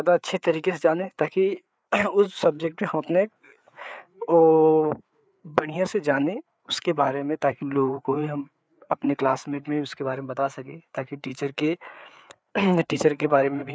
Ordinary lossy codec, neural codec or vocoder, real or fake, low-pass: none; codec, 16 kHz, 4 kbps, FreqCodec, larger model; fake; none